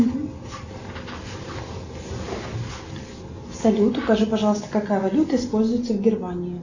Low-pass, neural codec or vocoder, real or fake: 7.2 kHz; none; real